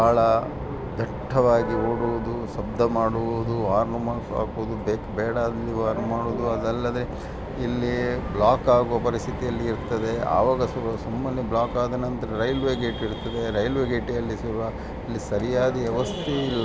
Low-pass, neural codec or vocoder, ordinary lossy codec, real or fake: none; none; none; real